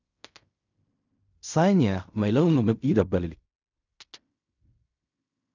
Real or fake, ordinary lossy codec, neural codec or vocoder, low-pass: fake; none; codec, 16 kHz in and 24 kHz out, 0.4 kbps, LongCat-Audio-Codec, fine tuned four codebook decoder; 7.2 kHz